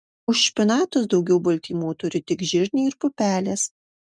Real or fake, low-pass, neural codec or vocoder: real; 9.9 kHz; none